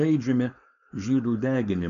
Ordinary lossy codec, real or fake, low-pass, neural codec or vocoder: MP3, 96 kbps; fake; 7.2 kHz; codec, 16 kHz, 4.8 kbps, FACodec